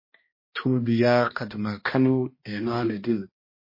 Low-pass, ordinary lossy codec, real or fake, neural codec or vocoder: 5.4 kHz; MP3, 24 kbps; fake; codec, 16 kHz, 1 kbps, X-Codec, HuBERT features, trained on balanced general audio